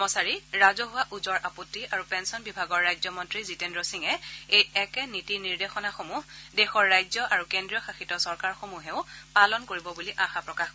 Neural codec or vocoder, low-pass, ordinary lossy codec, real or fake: none; none; none; real